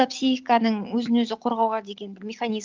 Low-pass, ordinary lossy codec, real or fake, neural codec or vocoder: 7.2 kHz; Opus, 32 kbps; real; none